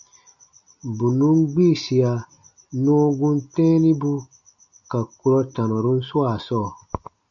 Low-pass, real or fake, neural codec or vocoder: 7.2 kHz; real; none